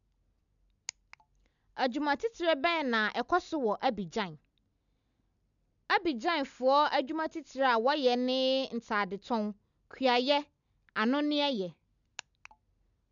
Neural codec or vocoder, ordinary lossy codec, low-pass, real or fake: none; none; 7.2 kHz; real